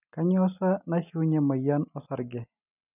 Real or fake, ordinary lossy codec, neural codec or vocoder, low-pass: real; none; none; 3.6 kHz